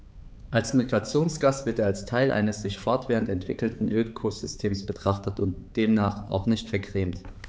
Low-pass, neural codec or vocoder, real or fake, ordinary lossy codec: none; codec, 16 kHz, 4 kbps, X-Codec, HuBERT features, trained on balanced general audio; fake; none